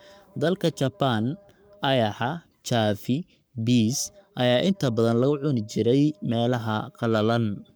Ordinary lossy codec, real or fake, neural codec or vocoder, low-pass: none; fake; codec, 44.1 kHz, 7.8 kbps, Pupu-Codec; none